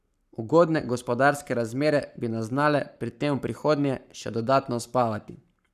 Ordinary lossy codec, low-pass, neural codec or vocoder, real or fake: none; 14.4 kHz; codec, 44.1 kHz, 7.8 kbps, Pupu-Codec; fake